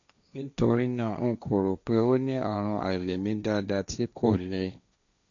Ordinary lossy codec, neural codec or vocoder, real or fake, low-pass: none; codec, 16 kHz, 1.1 kbps, Voila-Tokenizer; fake; 7.2 kHz